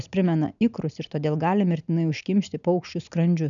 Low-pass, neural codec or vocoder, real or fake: 7.2 kHz; none; real